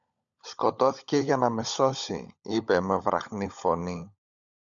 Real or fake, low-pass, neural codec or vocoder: fake; 7.2 kHz; codec, 16 kHz, 16 kbps, FunCodec, trained on LibriTTS, 50 frames a second